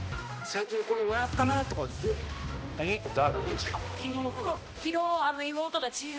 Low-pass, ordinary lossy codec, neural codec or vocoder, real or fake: none; none; codec, 16 kHz, 1 kbps, X-Codec, HuBERT features, trained on balanced general audio; fake